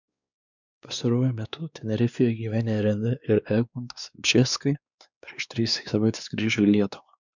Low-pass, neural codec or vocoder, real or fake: 7.2 kHz; codec, 16 kHz, 2 kbps, X-Codec, WavLM features, trained on Multilingual LibriSpeech; fake